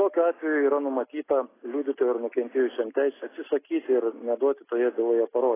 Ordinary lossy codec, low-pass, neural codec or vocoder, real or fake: AAC, 16 kbps; 3.6 kHz; none; real